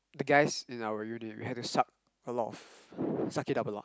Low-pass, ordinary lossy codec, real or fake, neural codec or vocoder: none; none; real; none